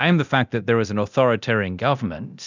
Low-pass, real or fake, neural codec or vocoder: 7.2 kHz; fake; codec, 24 kHz, 0.9 kbps, DualCodec